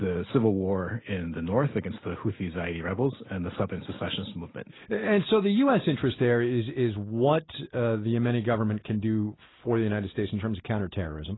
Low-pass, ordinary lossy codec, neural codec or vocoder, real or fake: 7.2 kHz; AAC, 16 kbps; none; real